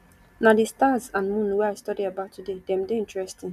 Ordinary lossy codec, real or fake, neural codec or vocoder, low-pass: none; real; none; 14.4 kHz